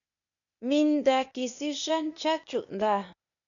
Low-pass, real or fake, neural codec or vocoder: 7.2 kHz; fake; codec, 16 kHz, 0.8 kbps, ZipCodec